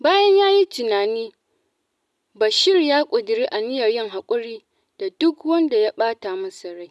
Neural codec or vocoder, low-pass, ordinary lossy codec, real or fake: none; none; none; real